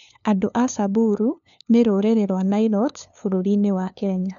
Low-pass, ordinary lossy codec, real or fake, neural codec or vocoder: 7.2 kHz; none; fake; codec, 16 kHz, 2 kbps, FunCodec, trained on LibriTTS, 25 frames a second